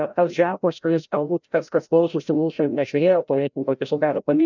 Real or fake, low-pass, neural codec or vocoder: fake; 7.2 kHz; codec, 16 kHz, 0.5 kbps, FreqCodec, larger model